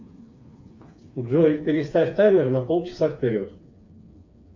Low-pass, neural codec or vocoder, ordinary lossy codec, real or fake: 7.2 kHz; codec, 16 kHz, 4 kbps, FreqCodec, smaller model; MP3, 48 kbps; fake